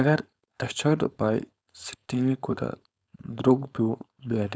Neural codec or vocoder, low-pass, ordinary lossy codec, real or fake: codec, 16 kHz, 4.8 kbps, FACodec; none; none; fake